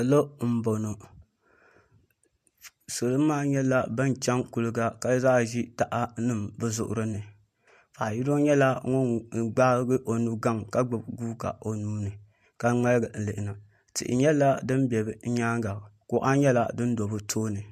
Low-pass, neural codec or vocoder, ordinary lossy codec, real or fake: 14.4 kHz; none; MP3, 64 kbps; real